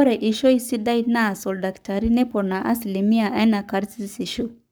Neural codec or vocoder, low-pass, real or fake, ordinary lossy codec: codec, 44.1 kHz, 7.8 kbps, Pupu-Codec; none; fake; none